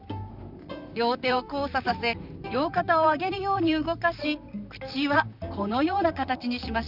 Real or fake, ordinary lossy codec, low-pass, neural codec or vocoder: fake; none; 5.4 kHz; vocoder, 44.1 kHz, 128 mel bands, Pupu-Vocoder